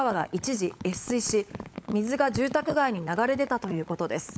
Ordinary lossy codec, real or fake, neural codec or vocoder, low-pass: none; fake; codec, 16 kHz, 16 kbps, FunCodec, trained on LibriTTS, 50 frames a second; none